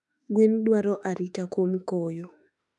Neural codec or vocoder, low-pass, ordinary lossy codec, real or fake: autoencoder, 48 kHz, 32 numbers a frame, DAC-VAE, trained on Japanese speech; 10.8 kHz; none; fake